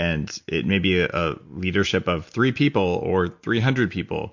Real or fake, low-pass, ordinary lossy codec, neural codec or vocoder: real; 7.2 kHz; MP3, 48 kbps; none